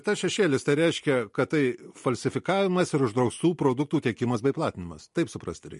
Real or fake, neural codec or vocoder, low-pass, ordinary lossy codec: real; none; 14.4 kHz; MP3, 48 kbps